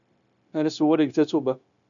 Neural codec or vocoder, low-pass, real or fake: codec, 16 kHz, 0.9 kbps, LongCat-Audio-Codec; 7.2 kHz; fake